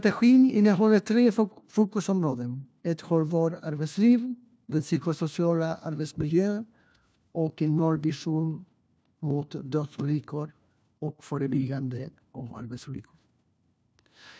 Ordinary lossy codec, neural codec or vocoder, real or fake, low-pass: none; codec, 16 kHz, 1 kbps, FunCodec, trained on LibriTTS, 50 frames a second; fake; none